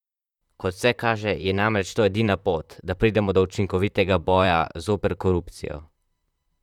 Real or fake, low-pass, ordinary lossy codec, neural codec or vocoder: fake; 19.8 kHz; none; vocoder, 44.1 kHz, 128 mel bands, Pupu-Vocoder